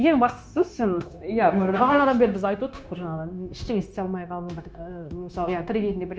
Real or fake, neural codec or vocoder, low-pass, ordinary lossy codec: fake; codec, 16 kHz, 0.9 kbps, LongCat-Audio-Codec; none; none